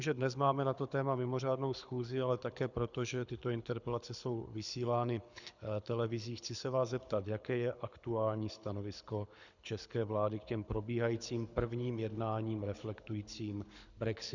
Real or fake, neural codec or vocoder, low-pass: fake; codec, 24 kHz, 6 kbps, HILCodec; 7.2 kHz